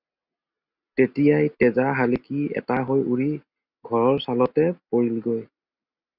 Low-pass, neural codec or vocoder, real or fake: 5.4 kHz; none; real